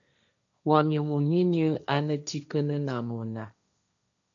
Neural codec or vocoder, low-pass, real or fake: codec, 16 kHz, 1.1 kbps, Voila-Tokenizer; 7.2 kHz; fake